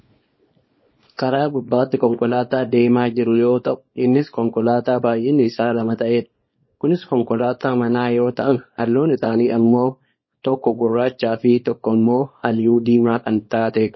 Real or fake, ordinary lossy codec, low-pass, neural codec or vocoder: fake; MP3, 24 kbps; 7.2 kHz; codec, 24 kHz, 0.9 kbps, WavTokenizer, small release